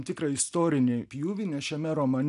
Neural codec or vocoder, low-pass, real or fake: none; 10.8 kHz; real